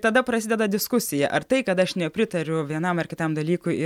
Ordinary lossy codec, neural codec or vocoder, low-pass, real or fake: MP3, 96 kbps; none; 19.8 kHz; real